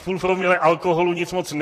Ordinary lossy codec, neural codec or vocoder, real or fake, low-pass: AAC, 48 kbps; vocoder, 44.1 kHz, 128 mel bands, Pupu-Vocoder; fake; 14.4 kHz